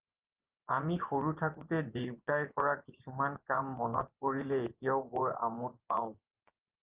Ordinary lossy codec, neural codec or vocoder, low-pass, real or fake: Opus, 32 kbps; vocoder, 24 kHz, 100 mel bands, Vocos; 3.6 kHz; fake